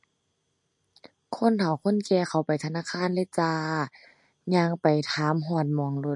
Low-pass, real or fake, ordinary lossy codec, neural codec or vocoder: 10.8 kHz; real; MP3, 48 kbps; none